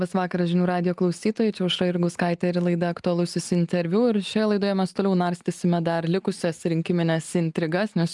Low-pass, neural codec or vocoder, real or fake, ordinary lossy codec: 10.8 kHz; none; real; Opus, 32 kbps